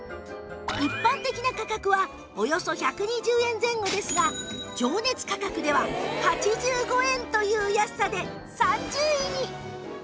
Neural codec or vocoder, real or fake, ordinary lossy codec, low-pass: none; real; none; none